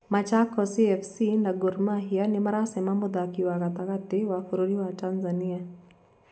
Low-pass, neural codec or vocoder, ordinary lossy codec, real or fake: none; none; none; real